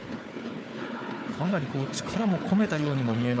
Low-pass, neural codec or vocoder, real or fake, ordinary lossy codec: none; codec, 16 kHz, 4 kbps, FunCodec, trained on Chinese and English, 50 frames a second; fake; none